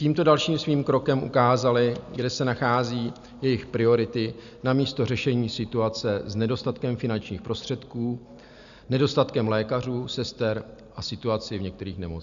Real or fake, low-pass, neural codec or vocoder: real; 7.2 kHz; none